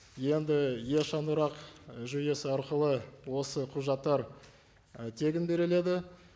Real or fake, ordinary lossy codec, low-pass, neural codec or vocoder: real; none; none; none